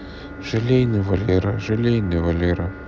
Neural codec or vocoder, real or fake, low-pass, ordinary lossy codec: none; real; none; none